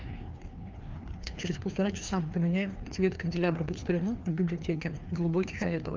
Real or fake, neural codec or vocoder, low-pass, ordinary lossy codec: fake; codec, 16 kHz, 2 kbps, FreqCodec, larger model; 7.2 kHz; Opus, 24 kbps